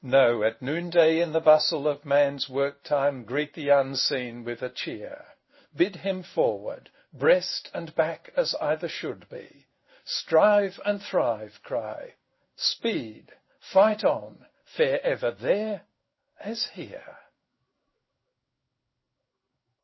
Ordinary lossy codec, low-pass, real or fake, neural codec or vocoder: MP3, 24 kbps; 7.2 kHz; fake; codec, 16 kHz in and 24 kHz out, 1 kbps, XY-Tokenizer